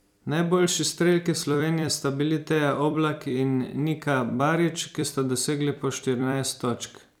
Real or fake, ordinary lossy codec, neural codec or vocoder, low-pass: fake; none; vocoder, 44.1 kHz, 128 mel bands every 256 samples, BigVGAN v2; 19.8 kHz